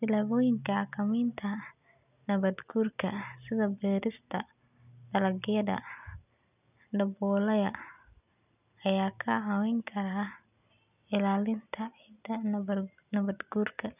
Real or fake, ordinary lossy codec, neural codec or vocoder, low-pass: real; none; none; 3.6 kHz